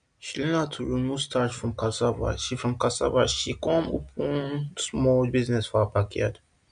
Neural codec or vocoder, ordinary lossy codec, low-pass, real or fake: none; MP3, 64 kbps; 9.9 kHz; real